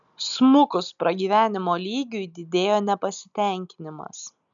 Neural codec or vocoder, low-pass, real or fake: none; 7.2 kHz; real